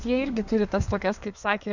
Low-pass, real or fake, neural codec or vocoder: 7.2 kHz; fake; codec, 32 kHz, 1.9 kbps, SNAC